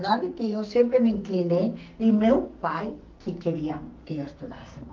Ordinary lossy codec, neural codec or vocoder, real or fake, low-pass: Opus, 24 kbps; codec, 44.1 kHz, 2.6 kbps, SNAC; fake; 7.2 kHz